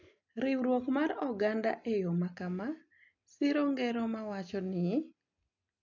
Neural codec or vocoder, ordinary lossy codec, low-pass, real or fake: none; MP3, 48 kbps; 7.2 kHz; real